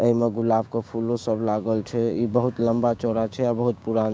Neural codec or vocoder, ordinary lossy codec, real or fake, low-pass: codec, 16 kHz, 6 kbps, DAC; none; fake; none